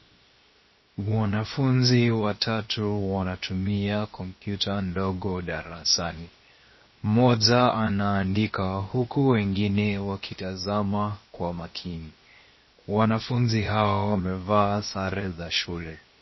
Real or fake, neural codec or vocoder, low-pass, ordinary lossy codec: fake; codec, 16 kHz, 0.7 kbps, FocalCodec; 7.2 kHz; MP3, 24 kbps